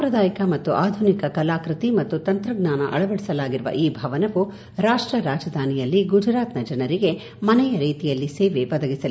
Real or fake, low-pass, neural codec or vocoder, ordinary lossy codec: real; none; none; none